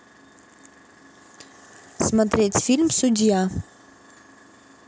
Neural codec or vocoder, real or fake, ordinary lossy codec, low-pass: none; real; none; none